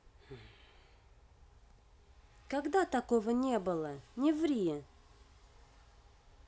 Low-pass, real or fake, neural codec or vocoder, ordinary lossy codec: none; real; none; none